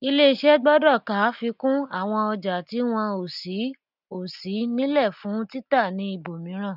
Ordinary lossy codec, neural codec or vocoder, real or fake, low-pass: none; none; real; 5.4 kHz